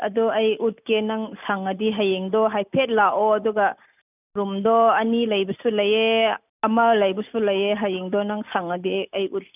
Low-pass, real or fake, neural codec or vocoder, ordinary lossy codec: 3.6 kHz; real; none; none